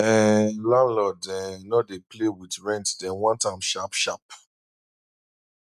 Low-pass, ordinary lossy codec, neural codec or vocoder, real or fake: 14.4 kHz; none; none; real